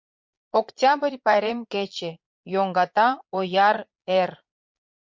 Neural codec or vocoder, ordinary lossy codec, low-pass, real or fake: vocoder, 22.05 kHz, 80 mel bands, Vocos; MP3, 48 kbps; 7.2 kHz; fake